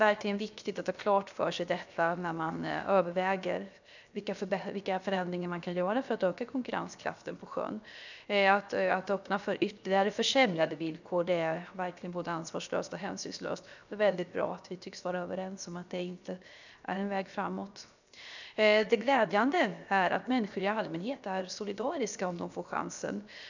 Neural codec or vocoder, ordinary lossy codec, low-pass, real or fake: codec, 16 kHz, 0.7 kbps, FocalCodec; none; 7.2 kHz; fake